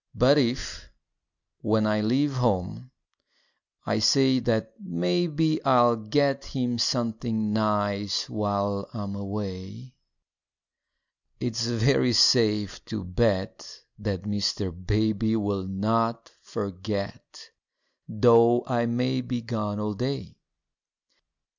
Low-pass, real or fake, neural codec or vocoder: 7.2 kHz; real; none